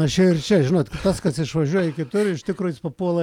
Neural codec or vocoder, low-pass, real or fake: none; 19.8 kHz; real